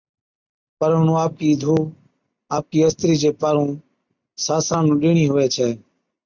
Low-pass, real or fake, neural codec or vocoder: 7.2 kHz; real; none